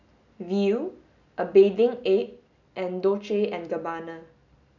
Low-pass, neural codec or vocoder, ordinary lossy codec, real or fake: 7.2 kHz; none; none; real